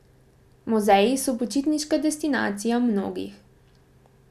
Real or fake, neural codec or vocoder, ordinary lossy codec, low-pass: real; none; none; 14.4 kHz